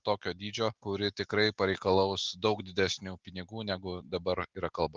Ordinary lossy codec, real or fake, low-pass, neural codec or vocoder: Opus, 32 kbps; real; 7.2 kHz; none